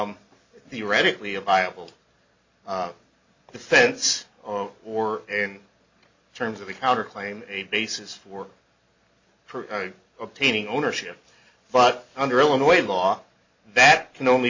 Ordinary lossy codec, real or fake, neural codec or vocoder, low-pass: MP3, 48 kbps; real; none; 7.2 kHz